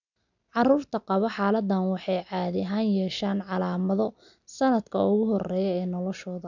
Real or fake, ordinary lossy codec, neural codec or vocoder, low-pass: real; none; none; 7.2 kHz